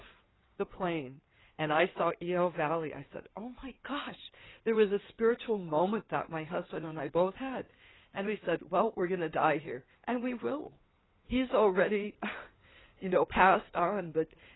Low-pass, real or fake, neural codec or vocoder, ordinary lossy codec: 7.2 kHz; fake; codec, 16 kHz in and 24 kHz out, 2.2 kbps, FireRedTTS-2 codec; AAC, 16 kbps